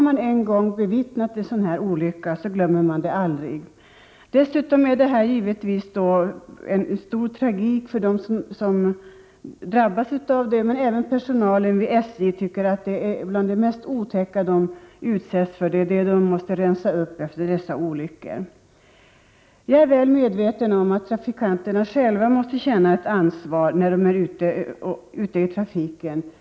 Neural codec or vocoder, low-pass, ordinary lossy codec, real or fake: none; none; none; real